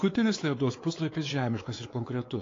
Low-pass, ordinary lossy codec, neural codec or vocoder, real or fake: 7.2 kHz; AAC, 32 kbps; codec, 16 kHz, 4 kbps, X-Codec, WavLM features, trained on Multilingual LibriSpeech; fake